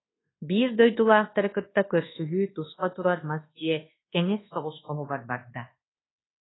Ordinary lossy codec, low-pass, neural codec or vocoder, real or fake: AAC, 16 kbps; 7.2 kHz; codec, 24 kHz, 1.2 kbps, DualCodec; fake